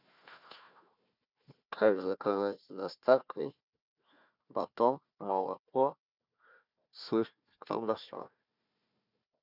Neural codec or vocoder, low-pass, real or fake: codec, 16 kHz, 1 kbps, FunCodec, trained on Chinese and English, 50 frames a second; 5.4 kHz; fake